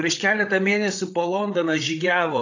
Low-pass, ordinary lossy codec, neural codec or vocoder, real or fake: 7.2 kHz; AAC, 48 kbps; codec, 16 kHz, 8 kbps, FreqCodec, larger model; fake